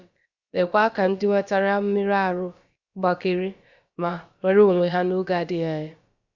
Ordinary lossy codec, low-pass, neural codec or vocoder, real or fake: Opus, 64 kbps; 7.2 kHz; codec, 16 kHz, about 1 kbps, DyCAST, with the encoder's durations; fake